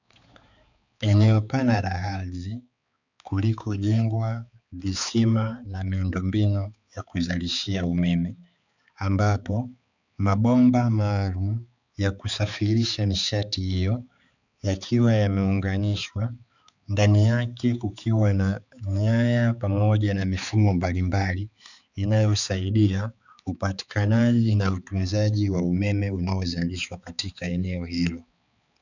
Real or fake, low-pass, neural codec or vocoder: fake; 7.2 kHz; codec, 16 kHz, 4 kbps, X-Codec, HuBERT features, trained on balanced general audio